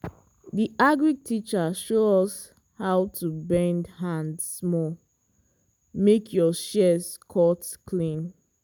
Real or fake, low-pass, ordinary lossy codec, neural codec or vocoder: real; none; none; none